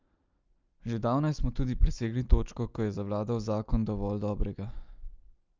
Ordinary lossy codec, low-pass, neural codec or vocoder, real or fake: Opus, 24 kbps; 7.2 kHz; none; real